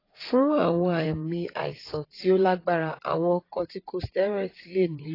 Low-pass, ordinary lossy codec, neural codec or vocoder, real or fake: 5.4 kHz; AAC, 24 kbps; vocoder, 44.1 kHz, 80 mel bands, Vocos; fake